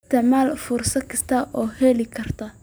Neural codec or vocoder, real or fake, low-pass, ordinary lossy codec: none; real; none; none